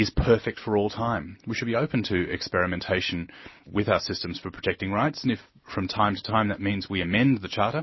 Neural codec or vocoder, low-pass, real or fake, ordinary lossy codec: none; 7.2 kHz; real; MP3, 24 kbps